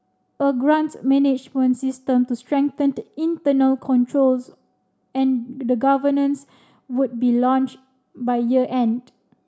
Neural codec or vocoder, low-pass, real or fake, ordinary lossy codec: none; none; real; none